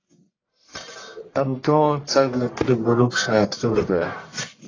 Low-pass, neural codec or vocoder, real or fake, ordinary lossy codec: 7.2 kHz; codec, 44.1 kHz, 1.7 kbps, Pupu-Codec; fake; AAC, 32 kbps